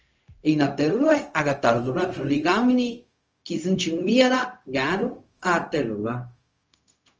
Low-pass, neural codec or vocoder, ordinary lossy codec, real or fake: 7.2 kHz; codec, 16 kHz, 0.4 kbps, LongCat-Audio-Codec; Opus, 24 kbps; fake